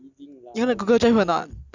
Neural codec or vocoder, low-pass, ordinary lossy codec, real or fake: none; 7.2 kHz; none; real